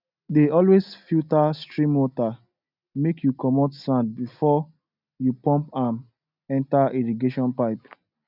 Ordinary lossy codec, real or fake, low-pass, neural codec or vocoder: none; real; 5.4 kHz; none